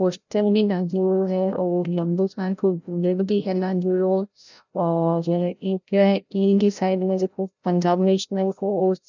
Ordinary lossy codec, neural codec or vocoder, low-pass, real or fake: none; codec, 16 kHz, 0.5 kbps, FreqCodec, larger model; 7.2 kHz; fake